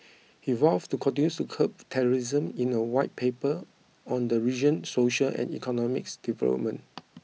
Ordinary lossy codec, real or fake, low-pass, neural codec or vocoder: none; real; none; none